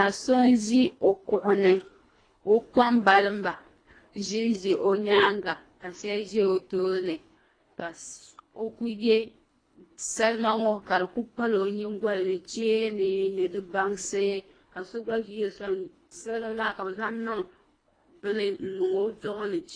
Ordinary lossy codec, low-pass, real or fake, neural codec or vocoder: AAC, 32 kbps; 9.9 kHz; fake; codec, 24 kHz, 1.5 kbps, HILCodec